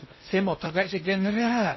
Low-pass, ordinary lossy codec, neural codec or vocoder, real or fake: 7.2 kHz; MP3, 24 kbps; codec, 16 kHz in and 24 kHz out, 0.6 kbps, FocalCodec, streaming, 2048 codes; fake